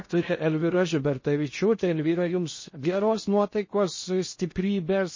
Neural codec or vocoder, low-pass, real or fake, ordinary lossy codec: codec, 16 kHz in and 24 kHz out, 0.6 kbps, FocalCodec, streaming, 2048 codes; 7.2 kHz; fake; MP3, 32 kbps